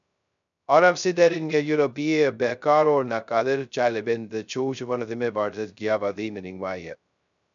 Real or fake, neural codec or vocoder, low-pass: fake; codec, 16 kHz, 0.2 kbps, FocalCodec; 7.2 kHz